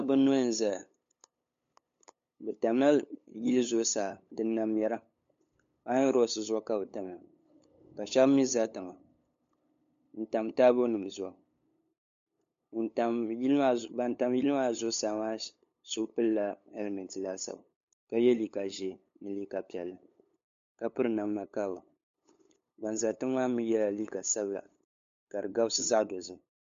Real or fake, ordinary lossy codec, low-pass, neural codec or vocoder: fake; MP3, 48 kbps; 7.2 kHz; codec, 16 kHz, 8 kbps, FunCodec, trained on LibriTTS, 25 frames a second